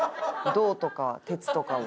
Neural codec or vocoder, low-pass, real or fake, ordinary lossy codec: none; none; real; none